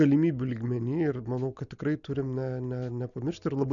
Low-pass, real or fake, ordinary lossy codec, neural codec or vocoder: 7.2 kHz; real; MP3, 96 kbps; none